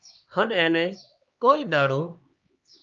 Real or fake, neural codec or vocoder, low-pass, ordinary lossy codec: fake; codec, 16 kHz, 2 kbps, X-Codec, HuBERT features, trained on LibriSpeech; 7.2 kHz; Opus, 24 kbps